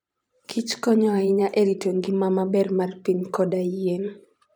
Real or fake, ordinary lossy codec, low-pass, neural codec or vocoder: fake; none; 19.8 kHz; vocoder, 44.1 kHz, 128 mel bands every 512 samples, BigVGAN v2